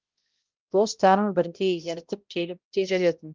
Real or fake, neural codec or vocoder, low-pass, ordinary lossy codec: fake; codec, 16 kHz, 0.5 kbps, X-Codec, HuBERT features, trained on balanced general audio; 7.2 kHz; Opus, 24 kbps